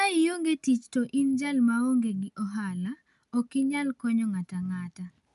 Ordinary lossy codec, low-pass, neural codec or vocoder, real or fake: none; 10.8 kHz; none; real